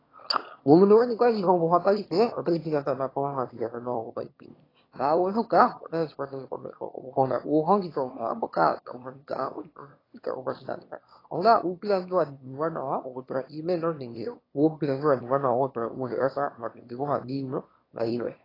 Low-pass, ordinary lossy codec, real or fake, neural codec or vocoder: 5.4 kHz; AAC, 24 kbps; fake; autoencoder, 22.05 kHz, a latent of 192 numbers a frame, VITS, trained on one speaker